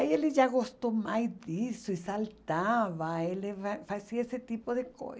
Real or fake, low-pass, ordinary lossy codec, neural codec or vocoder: real; none; none; none